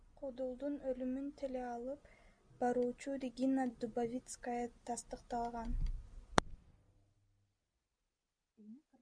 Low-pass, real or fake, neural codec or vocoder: 9.9 kHz; real; none